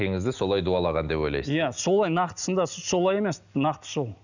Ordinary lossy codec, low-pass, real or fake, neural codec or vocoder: none; 7.2 kHz; real; none